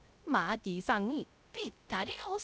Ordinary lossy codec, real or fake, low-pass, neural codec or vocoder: none; fake; none; codec, 16 kHz, 0.3 kbps, FocalCodec